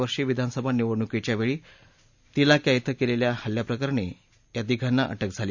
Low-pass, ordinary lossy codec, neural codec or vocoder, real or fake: 7.2 kHz; none; none; real